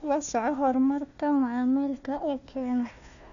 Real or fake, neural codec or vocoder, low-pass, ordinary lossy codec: fake; codec, 16 kHz, 1 kbps, FunCodec, trained on Chinese and English, 50 frames a second; 7.2 kHz; none